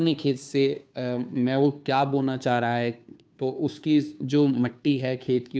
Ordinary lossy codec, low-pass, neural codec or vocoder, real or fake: none; none; codec, 16 kHz, 2 kbps, FunCodec, trained on Chinese and English, 25 frames a second; fake